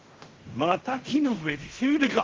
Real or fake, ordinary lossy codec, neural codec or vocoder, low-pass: fake; Opus, 16 kbps; codec, 16 kHz in and 24 kHz out, 0.4 kbps, LongCat-Audio-Codec, fine tuned four codebook decoder; 7.2 kHz